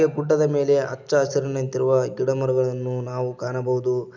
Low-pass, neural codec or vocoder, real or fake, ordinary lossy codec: 7.2 kHz; none; real; none